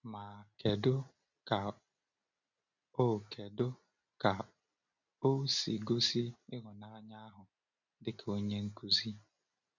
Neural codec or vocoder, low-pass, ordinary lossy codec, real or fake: none; 7.2 kHz; none; real